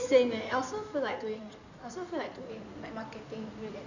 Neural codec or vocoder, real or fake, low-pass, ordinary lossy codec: codec, 16 kHz in and 24 kHz out, 2.2 kbps, FireRedTTS-2 codec; fake; 7.2 kHz; none